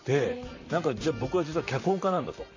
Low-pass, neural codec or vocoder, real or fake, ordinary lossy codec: 7.2 kHz; vocoder, 44.1 kHz, 128 mel bands, Pupu-Vocoder; fake; AAC, 48 kbps